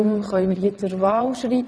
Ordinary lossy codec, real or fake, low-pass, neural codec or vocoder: none; fake; none; vocoder, 22.05 kHz, 80 mel bands, WaveNeXt